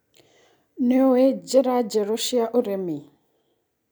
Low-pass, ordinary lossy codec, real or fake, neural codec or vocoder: none; none; real; none